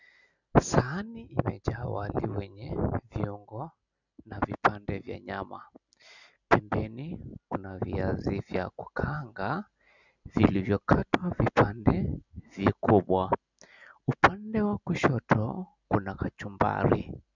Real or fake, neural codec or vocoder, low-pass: real; none; 7.2 kHz